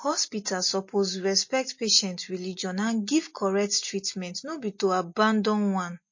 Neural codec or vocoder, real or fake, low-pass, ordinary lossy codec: none; real; 7.2 kHz; MP3, 32 kbps